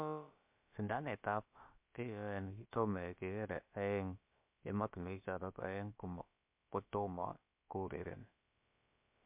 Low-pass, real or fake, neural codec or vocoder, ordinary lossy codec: 3.6 kHz; fake; codec, 16 kHz, about 1 kbps, DyCAST, with the encoder's durations; MP3, 32 kbps